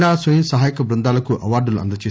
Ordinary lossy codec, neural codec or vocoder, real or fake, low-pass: none; none; real; none